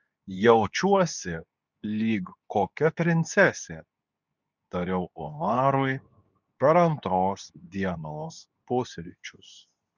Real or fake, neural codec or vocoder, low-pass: fake; codec, 24 kHz, 0.9 kbps, WavTokenizer, medium speech release version 2; 7.2 kHz